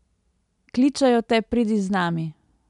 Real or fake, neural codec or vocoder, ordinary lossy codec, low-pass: real; none; none; 10.8 kHz